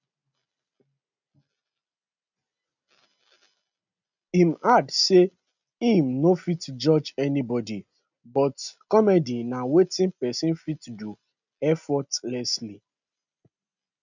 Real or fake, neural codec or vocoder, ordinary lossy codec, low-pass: real; none; none; 7.2 kHz